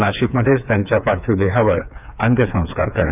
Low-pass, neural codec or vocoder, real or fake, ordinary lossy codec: 3.6 kHz; codec, 16 kHz, 4 kbps, FreqCodec, smaller model; fake; none